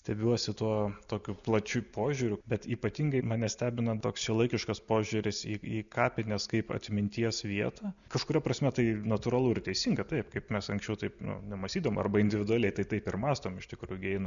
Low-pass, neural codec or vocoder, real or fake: 7.2 kHz; none; real